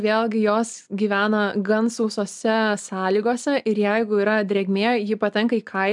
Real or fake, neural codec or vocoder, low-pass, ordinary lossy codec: real; none; 10.8 kHz; MP3, 96 kbps